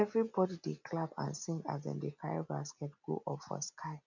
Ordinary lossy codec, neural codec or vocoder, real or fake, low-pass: none; none; real; 7.2 kHz